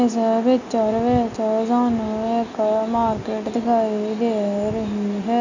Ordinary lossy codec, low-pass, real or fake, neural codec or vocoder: none; 7.2 kHz; real; none